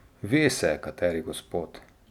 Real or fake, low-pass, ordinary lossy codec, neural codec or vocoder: real; 19.8 kHz; none; none